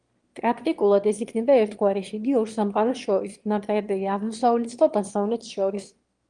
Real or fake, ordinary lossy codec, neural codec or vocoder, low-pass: fake; Opus, 24 kbps; autoencoder, 22.05 kHz, a latent of 192 numbers a frame, VITS, trained on one speaker; 9.9 kHz